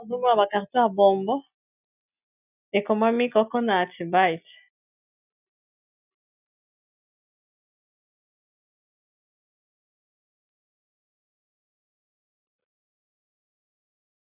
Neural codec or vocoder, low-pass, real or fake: none; 3.6 kHz; real